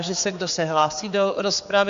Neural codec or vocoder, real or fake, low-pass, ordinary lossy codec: codec, 16 kHz, 4 kbps, X-Codec, HuBERT features, trained on general audio; fake; 7.2 kHz; AAC, 64 kbps